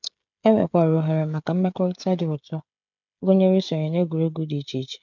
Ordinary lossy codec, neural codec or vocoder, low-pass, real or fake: none; codec, 16 kHz, 16 kbps, FreqCodec, smaller model; 7.2 kHz; fake